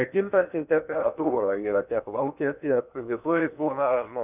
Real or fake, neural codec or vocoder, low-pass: fake; codec, 16 kHz in and 24 kHz out, 0.8 kbps, FocalCodec, streaming, 65536 codes; 3.6 kHz